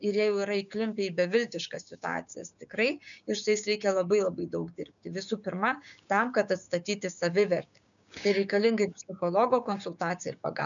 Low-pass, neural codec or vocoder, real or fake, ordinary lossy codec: 7.2 kHz; codec, 16 kHz, 6 kbps, DAC; fake; MP3, 96 kbps